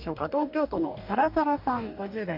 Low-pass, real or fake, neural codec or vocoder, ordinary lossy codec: 5.4 kHz; fake; codec, 44.1 kHz, 2.6 kbps, DAC; AAC, 48 kbps